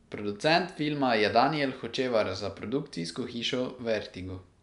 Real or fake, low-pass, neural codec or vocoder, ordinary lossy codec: real; 10.8 kHz; none; none